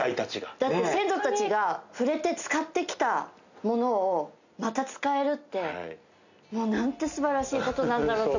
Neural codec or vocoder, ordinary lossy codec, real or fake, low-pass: none; none; real; 7.2 kHz